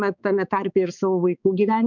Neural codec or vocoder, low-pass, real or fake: codec, 16 kHz, 4 kbps, X-Codec, HuBERT features, trained on balanced general audio; 7.2 kHz; fake